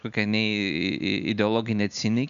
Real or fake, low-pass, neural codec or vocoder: real; 7.2 kHz; none